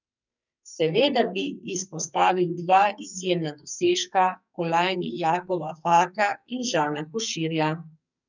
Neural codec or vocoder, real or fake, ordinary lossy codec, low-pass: codec, 44.1 kHz, 2.6 kbps, SNAC; fake; none; 7.2 kHz